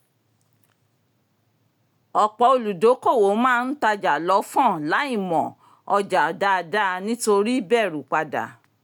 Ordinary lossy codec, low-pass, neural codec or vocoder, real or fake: none; 19.8 kHz; none; real